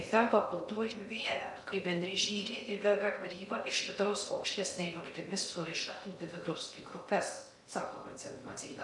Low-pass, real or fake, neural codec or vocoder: 10.8 kHz; fake; codec, 16 kHz in and 24 kHz out, 0.6 kbps, FocalCodec, streaming, 2048 codes